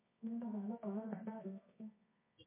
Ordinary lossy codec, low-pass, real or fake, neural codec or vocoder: MP3, 24 kbps; 3.6 kHz; fake; codec, 24 kHz, 0.9 kbps, WavTokenizer, medium music audio release